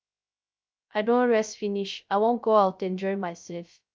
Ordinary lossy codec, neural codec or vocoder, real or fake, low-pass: none; codec, 16 kHz, 0.3 kbps, FocalCodec; fake; none